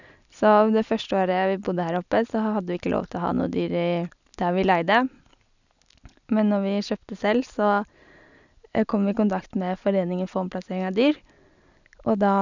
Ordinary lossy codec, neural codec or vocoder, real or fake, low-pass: none; none; real; 7.2 kHz